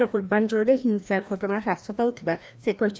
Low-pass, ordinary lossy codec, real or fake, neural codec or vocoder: none; none; fake; codec, 16 kHz, 1 kbps, FreqCodec, larger model